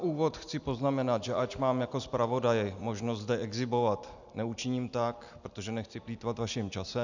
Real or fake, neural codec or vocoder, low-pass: real; none; 7.2 kHz